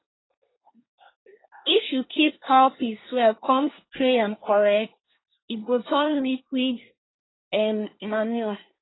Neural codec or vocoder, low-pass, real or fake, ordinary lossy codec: codec, 24 kHz, 1 kbps, SNAC; 7.2 kHz; fake; AAC, 16 kbps